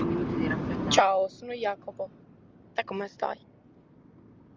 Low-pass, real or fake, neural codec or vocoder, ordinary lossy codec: 7.2 kHz; real; none; Opus, 32 kbps